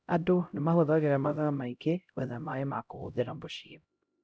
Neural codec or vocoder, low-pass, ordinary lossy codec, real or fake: codec, 16 kHz, 0.5 kbps, X-Codec, HuBERT features, trained on LibriSpeech; none; none; fake